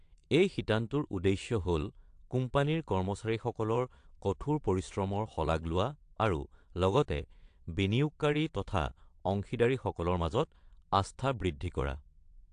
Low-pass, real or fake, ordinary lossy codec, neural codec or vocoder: 9.9 kHz; fake; AAC, 64 kbps; vocoder, 22.05 kHz, 80 mel bands, WaveNeXt